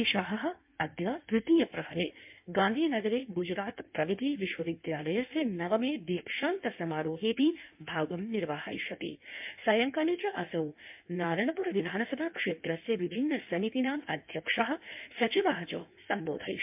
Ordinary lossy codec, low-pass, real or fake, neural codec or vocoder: MP3, 32 kbps; 3.6 kHz; fake; codec, 16 kHz in and 24 kHz out, 1.1 kbps, FireRedTTS-2 codec